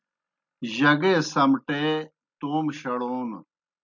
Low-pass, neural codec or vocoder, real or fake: 7.2 kHz; none; real